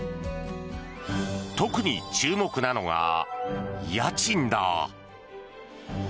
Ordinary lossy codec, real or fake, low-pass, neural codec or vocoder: none; real; none; none